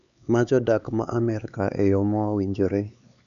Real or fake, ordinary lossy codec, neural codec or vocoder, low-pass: fake; none; codec, 16 kHz, 4 kbps, X-Codec, HuBERT features, trained on LibriSpeech; 7.2 kHz